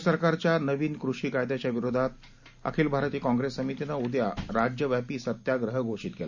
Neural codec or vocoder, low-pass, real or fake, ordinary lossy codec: none; 7.2 kHz; real; none